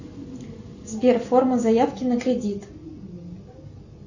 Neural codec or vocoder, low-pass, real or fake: none; 7.2 kHz; real